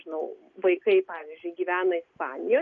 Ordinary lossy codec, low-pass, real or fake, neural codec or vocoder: MP3, 48 kbps; 7.2 kHz; real; none